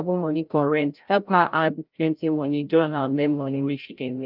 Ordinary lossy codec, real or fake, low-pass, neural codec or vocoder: Opus, 32 kbps; fake; 5.4 kHz; codec, 16 kHz, 0.5 kbps, FreqCodec, larger model